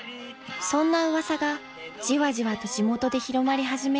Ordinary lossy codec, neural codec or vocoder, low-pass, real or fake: none; none; none; real